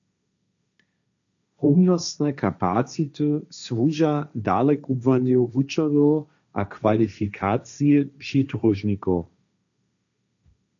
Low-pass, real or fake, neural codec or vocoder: 7.2 kHz; fake; codec, 16 kHz, 1.1 kbps, Voila-Tokenizer